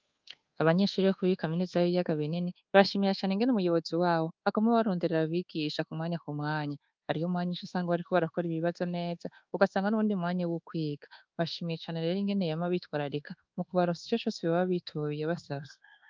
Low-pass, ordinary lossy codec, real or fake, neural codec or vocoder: 7.2 kHz; Opus, 32 kbps; fake; codec, 24 kHz, 1.2 kbps, DualCodec